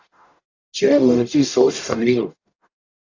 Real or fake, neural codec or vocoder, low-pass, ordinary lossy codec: fake; codec, 44.1 kHz, 0.9 kbps, DAC; 7.2 kHz; AAC, 48 kbps